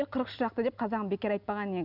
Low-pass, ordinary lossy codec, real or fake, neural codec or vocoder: 5.4 kHz; none; real; none